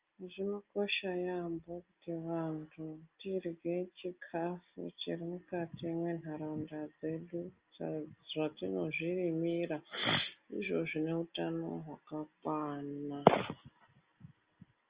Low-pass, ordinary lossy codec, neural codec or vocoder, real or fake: 3.6 kHz; Opus, 24 kbps; none; real